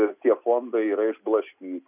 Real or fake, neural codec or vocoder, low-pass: real; none; 3.6 kHz